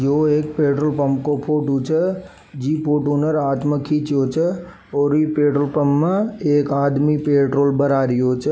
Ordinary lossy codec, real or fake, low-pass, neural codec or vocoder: none; real; none; none